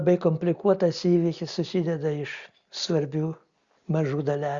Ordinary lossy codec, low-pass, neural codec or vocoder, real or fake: Opus, 64 kbps; 7.2 kHz; none; real